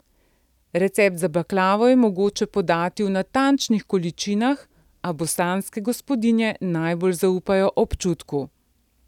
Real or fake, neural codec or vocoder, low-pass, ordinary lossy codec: real; none; 19.8 kHz; none